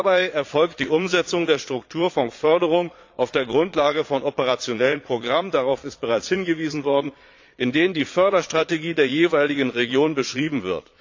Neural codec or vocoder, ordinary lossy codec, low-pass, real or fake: vocoder, 22.05 kHz, 80 mel bands, Vocos; AAC, 48 kbps; 7.2 kHz; fake